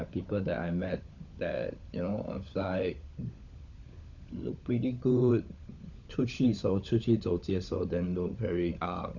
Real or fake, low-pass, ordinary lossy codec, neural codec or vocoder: fake; 7.2 kHz; none; codec, 16 kHz, 16 kbps, FunCodec, trained on LibriTTS, 50 frames a second